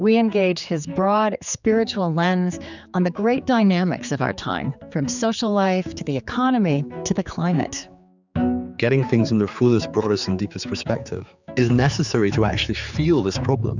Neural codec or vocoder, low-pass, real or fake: codec, 16 kHz, 4 kbps, X-Codec, HuBERT features, trained on general audio; 7.2 kHz; fake